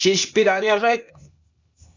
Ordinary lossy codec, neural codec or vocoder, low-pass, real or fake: MP3, 64 kbps; codec, 16 kHz, 0.8 kbps, ZipCodec; 7.2 kHz; fake